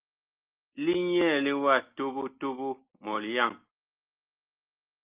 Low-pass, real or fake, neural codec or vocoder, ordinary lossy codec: 3.6 kHz; real; none; Opus, 24 kbps